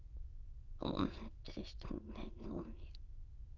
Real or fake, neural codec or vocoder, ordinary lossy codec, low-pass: fake; autoencoder, 22.05 kHz, a latent of 192 numbers a frame, VITS, trained on many speakers; Opus, 32 kbps; 7.2 kHz